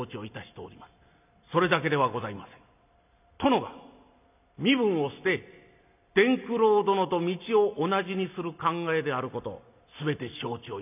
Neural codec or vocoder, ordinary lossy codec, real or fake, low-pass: none; none; real; 3.6 kHz